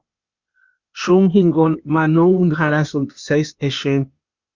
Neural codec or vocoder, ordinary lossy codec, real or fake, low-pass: codec, 16 kHz, 0.8 kbps, ZipCodec; Opus, 64 kbps; fake; 7.2 kHz